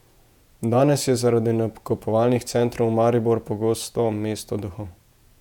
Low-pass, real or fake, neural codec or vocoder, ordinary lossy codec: 19.8 kHz; fake; vocoder, 48 kHz, 128 mel bands, Vocos; none